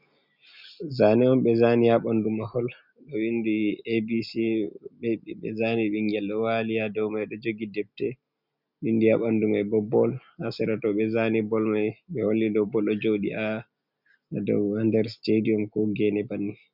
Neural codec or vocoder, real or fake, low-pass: none; real; 5.4 kHz